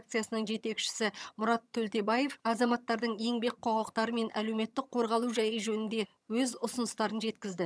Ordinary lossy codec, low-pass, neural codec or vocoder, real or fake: none; none; vocoder, 22.05 kHz, 80 mel bands, HiFi-GAN; fake